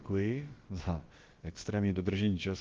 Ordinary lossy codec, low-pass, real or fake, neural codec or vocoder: Opus, 16 kbps; 7.2 kHz; fake; codec, 16 kHz, about 1 kbps, DyCAST, with the encoder's durations